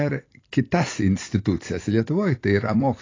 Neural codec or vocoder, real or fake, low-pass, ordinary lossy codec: vocoder, 44.1 kHz, 128 mel bands every 256 samples, BigVGAN v2; fake; 7.2 kHz; AAC, 32 kbps